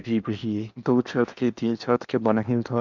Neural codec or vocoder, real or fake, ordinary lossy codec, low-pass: codec, 16 kHz in and 24 kHz out, 0.8 kbps, FocalCodec, streaming, 65536 codes; fake; none; 7.2 kHz